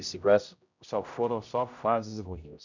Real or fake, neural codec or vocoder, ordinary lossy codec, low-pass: fake; codec, 16 kHz, 0.5 kbps, X-Codec, HuBERT features, trained on general audio; none; 7.2 kHz